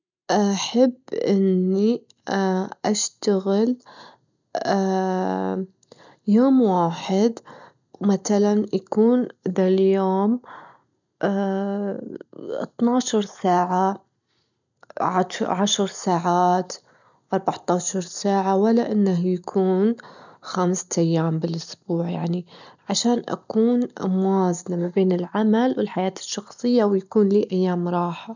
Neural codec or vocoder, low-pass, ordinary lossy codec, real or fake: none; 7.2 kHz; none; real